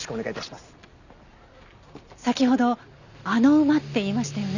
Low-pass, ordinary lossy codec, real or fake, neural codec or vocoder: 7.2 kHz; none; real; none